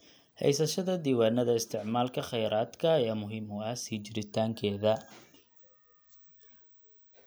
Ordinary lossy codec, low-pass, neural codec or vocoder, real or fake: none; none; none; real